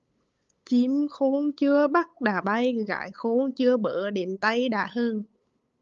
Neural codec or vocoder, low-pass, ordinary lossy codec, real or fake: codec, 16 kHz, 8 kbps, FunCodec, trained on LibriTTS, 25 frames a second; 7.2 kHz; Opus, 32 kbps; fake